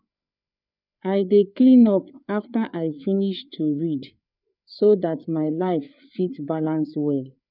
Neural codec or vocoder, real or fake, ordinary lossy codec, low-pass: codec, 16 kHz, 4 kbps, FreqCodec, larger model; fake; none; 5.4 kHz